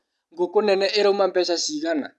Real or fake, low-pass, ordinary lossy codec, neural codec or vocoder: real; none; none; none